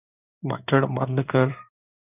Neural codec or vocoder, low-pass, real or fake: codec, 16 kHz in and 24 kHz out, 1 kbps, XY-Tokenizer; 3.6 kHz; fake